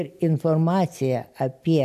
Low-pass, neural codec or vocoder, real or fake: 14.4 kHz; none; real